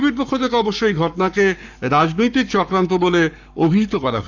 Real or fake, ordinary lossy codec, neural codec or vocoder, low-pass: fake; none; codec, 44.1 kHz, 7.8 kbps, Pupu-Codec; 7.2 kHz